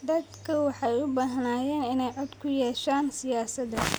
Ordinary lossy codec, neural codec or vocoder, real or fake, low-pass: none; none; real; none